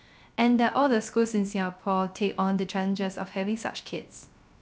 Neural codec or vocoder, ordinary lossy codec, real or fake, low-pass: codec, 16 kHz, 0.3 kbps, FocalCodec; none; fake; none